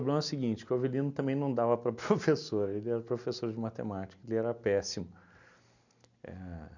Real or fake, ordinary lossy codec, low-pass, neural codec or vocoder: real; none; 7.2 kHz; none